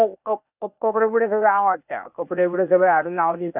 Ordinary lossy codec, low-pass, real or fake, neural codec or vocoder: none; 3.6 kHz; fake; codec, 16 kHz, 0.8 kbps, ZipCodec